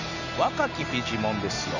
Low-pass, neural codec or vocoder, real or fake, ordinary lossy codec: 7.2 kHz; none; real; none